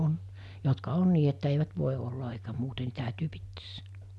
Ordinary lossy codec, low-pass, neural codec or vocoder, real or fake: none; none; none; real